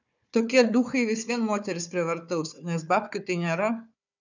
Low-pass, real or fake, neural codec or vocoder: 7.2 kHz; fake; codec, 16 kHz, 4 kbps, FunCodec, trained on Chinese and English, 50 frames a second